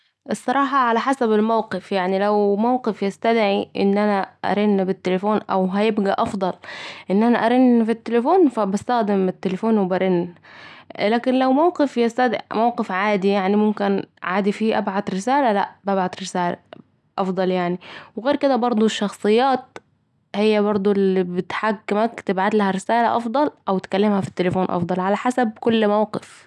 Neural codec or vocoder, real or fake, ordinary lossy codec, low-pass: none; real; none; none